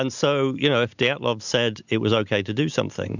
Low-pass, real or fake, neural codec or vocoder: 7.2 kHz; real; none